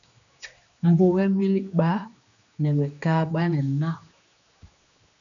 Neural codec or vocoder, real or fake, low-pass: codec, 16 kHz, 2 kbps, X-Codec, HuBERT features, trained on general audio; fake; 7.2 kHz